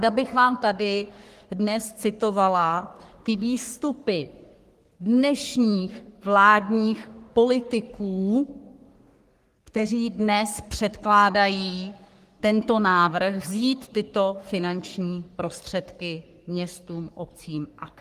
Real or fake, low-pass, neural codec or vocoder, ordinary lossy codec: fake; 14.4 kHz; codec, 44.1 kHz, 3.4 kbps, Pupu-Codec; Opus, 24 kbps